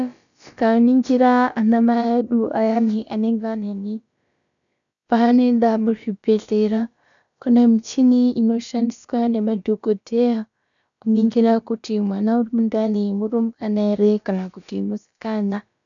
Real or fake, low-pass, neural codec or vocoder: fake; 7.2 kHz; codec, 16 kHz, about 1 kbps, DyCAST, with the encoder's durations